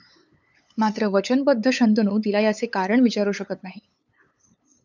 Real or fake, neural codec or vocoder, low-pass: fake; codec, 16 kHz, 8 kbps, FunCodec, trained on LibriTTS, 25 frames a second; 7.2 kHz